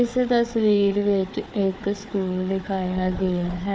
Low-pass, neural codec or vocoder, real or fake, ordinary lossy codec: none; codec, 16 kHz, 4 kbps, FunCodec, trained on Chinese and English, 50 frames a second; fake; none